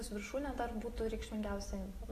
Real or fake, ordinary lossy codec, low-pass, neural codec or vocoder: real; AAC, 48 kbps; 14.4 kHz; none